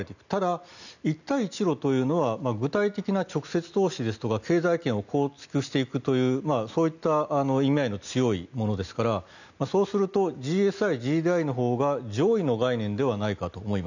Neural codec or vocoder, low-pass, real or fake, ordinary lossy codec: none; 7.2 kHz; real; none